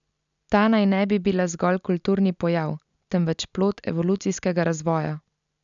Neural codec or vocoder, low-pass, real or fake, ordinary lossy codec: none; 7.2 kHz; real; none